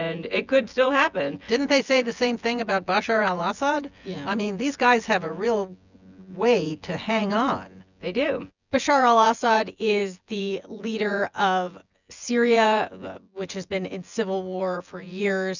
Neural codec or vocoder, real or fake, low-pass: vocoder, 24 kHz, 100 mel bands, Vocos; fake; 7.2 kHz